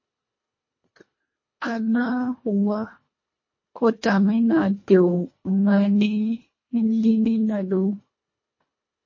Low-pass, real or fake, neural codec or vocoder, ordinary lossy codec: 7.2 kHz; fake; codec, 24 kHz, 1.5 kbps, HILCodec; MP3, 32 kbps